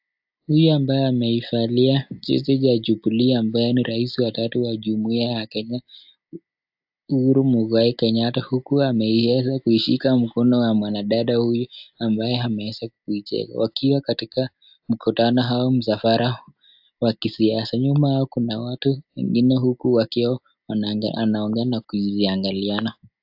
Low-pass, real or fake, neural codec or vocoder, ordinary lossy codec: 5.4 kHz; real; none; AAC, 48 kbps